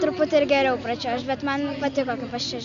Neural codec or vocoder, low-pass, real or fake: none; 7.2 kHz; real